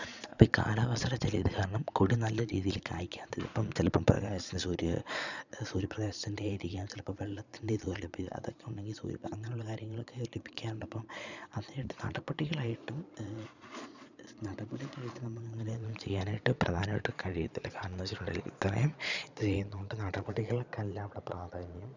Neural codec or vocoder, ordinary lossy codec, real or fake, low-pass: vocoder, 22.05 kHz, 80 mel bands, Vocos; none; fake; 7.2 kHz